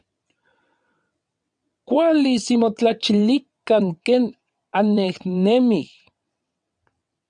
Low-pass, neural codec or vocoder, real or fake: 9.9 kHz; vocoder, 22.05 kHz, 80 mel bands, WaveNeXt; fake